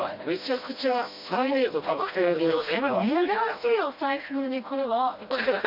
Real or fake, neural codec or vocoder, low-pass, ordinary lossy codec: fake; codec, 16 kHz, 1 kbps, FreqCodec, smaller model; 5.4 kHz; MP3, 48 kbps